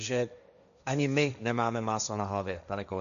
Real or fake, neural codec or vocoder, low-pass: fake; codec, 16 kHz, 1.1 kbps, Voila-Tokenizer; 7.2 kHz